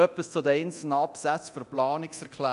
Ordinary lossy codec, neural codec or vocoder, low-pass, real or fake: none; codec, 24 kHz, 0.9 kbps, DualCodec; 10.8 kHz; fake